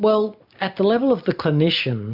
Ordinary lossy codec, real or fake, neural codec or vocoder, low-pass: AAC, 48 kbps; real; none; 5.4 kHz